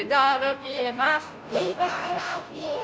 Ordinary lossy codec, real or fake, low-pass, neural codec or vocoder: none; fake; none; codec, 16 kHz, 0.5 kbps, FunCodec, trained on Chinese and English, 25 frames a second